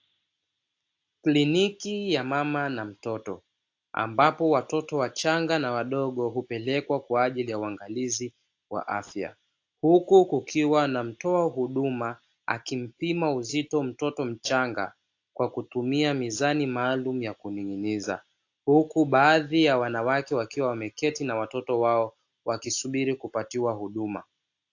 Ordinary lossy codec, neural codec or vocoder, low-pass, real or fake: AAC, 48 kbps; none; 7.2 kHz; real